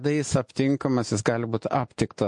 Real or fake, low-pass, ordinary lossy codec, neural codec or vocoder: real; 10.8 kHz; MP3, 48 kbps; none